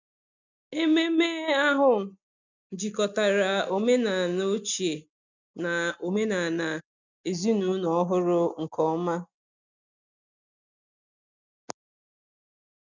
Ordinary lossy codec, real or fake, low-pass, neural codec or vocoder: none; real; 7.2 kHz; none